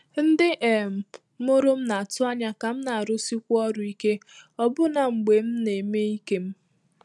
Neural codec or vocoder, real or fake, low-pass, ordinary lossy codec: none; real; none; none